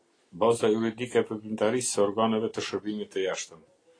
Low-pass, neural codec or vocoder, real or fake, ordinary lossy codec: 9.9 kHz; none; real; AAC, 32 kbps